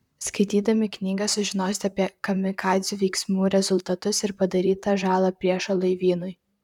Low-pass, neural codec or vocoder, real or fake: 19.8 kHz; vocoder, 44.1 kHz, 128 mel bands, Pupu-Vocoder; fake